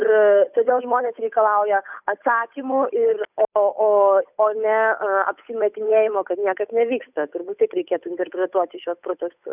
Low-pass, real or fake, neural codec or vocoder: 3.6 kHz; fake; codec, 16 kHz, 8 kbps, FunCodec, trained on Chinese and English, 25 frames a second